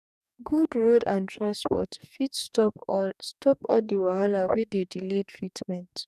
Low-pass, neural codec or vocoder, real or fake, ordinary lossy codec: 14.4 kHz; codec, 44.1 kHz, 2.6 kbps, DAC; fake; none